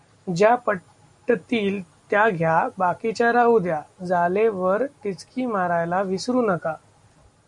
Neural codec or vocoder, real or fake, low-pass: none; real; 10.8 kHz